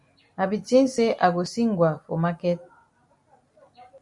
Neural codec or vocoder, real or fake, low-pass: none; real; 10.8 kHz